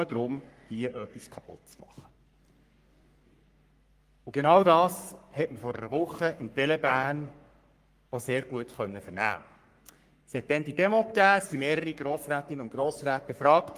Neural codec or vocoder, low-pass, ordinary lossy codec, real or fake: codec, 44.1 kHz, 3.4 kbps, Pupu-Codec; 14.4 kHz; Opus, 32 kbps; fake